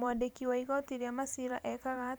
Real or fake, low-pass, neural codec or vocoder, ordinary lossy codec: fake; none; vocoder, 44.1 kHz, 128 mel bands every 512 samples, BigVGAN v2; none